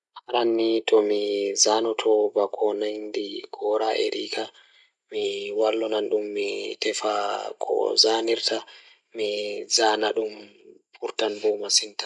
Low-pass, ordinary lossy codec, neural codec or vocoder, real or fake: 10.8 kHz; none; none; real